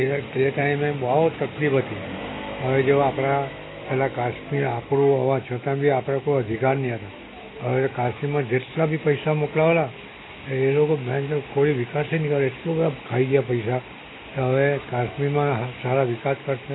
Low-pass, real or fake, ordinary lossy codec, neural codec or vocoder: 7.2 kHz; real; AAC, 16 kbps; none